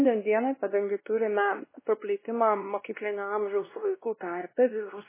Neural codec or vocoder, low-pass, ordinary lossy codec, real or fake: codec, 16 kHz, 1 kbps, X-Codec, WavLM features, trained on Multilingual LibriSpeech; 3.6 kHz; MP3, 16 kbps; fake